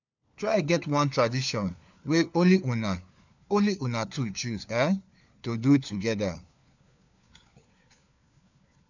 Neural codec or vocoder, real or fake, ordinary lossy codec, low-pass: codec, 16 kHz, 4 kbps, FunCodec, trained on LibriTTS, 50 frames a second; fake; none; 7.2 kHz